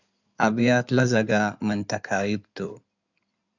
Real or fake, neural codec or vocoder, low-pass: fake; codec, 16 kHz in and 24 kHz out, 2.2 kbps, FireRedTTS-2 codec; 7.2 kHz